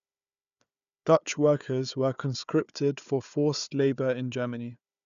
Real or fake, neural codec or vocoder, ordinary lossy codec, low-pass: fake; codec, 16 kHz, 4 kbps, FunCodec, trained on Chinese and English, 50 frames a second; none; 7.2 kHz